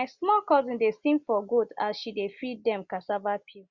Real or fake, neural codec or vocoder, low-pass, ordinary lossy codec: real; none; 7.2 kHz; Opus, 64 kbps